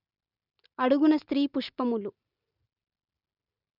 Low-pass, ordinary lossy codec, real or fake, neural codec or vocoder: 5.4 kHz; none; real; none